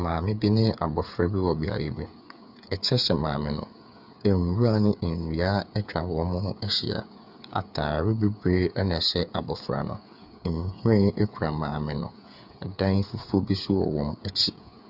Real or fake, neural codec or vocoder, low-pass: fake; codec, 16 kHz, 8 kbps, FreqCodec, smaller model; 5.4 kHz